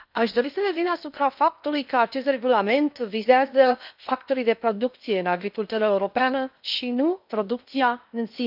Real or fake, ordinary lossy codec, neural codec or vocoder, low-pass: fake; none; codec, 16 kHz in and 24 kHz out, 0.6 kbps, FocalCodec, streaming, 2048 codes; 5.4 kHz